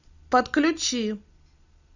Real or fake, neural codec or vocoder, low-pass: real; none; 7.2 kHz